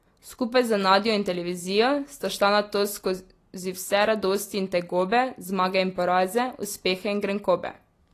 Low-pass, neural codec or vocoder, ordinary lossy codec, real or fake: 14.4 kHz; none; AAC, 48 kbps; real